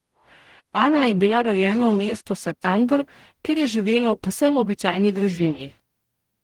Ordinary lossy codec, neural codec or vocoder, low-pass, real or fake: Opus, 24 kbps; codec, 44.1 kHz, 0.9 kbps, DAC; 19.8 kHz; fake